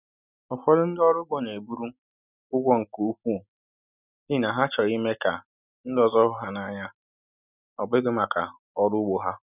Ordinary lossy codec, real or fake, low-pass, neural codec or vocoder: none; real; 3.6 kHz; none